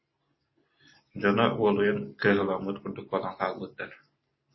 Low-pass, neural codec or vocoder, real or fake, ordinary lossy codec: 7.2 kHz; none; real; MP3, 24 kbps